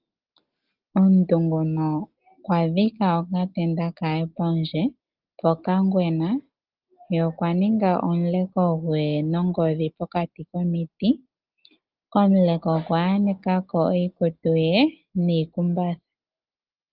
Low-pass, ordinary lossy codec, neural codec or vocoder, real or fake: 5.4 kHz; Opus, 32 kbps; none; real